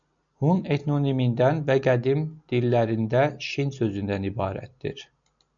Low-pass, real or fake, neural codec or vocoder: 7.2 kHz; real; none